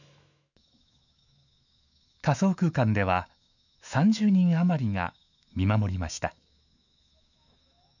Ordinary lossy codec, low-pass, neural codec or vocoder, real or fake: none; 7.2 kHz; autoencoder, 48 kHz, 128 numbers a frame, DAC-VAE, trained on Japanese speech; fake